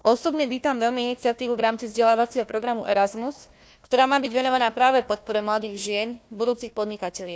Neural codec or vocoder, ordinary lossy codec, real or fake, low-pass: codec, 16 kHz, 1 kbps, FunCodec, trained on Chinese and English, 50 frames a second; none; fake; none